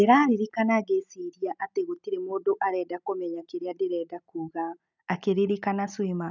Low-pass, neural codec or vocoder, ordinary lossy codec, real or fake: 7.2 kHz; none; none; real